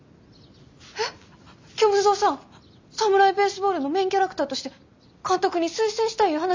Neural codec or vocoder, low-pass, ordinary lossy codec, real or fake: none; 7.2 kHz; MP3, 64 kbps; real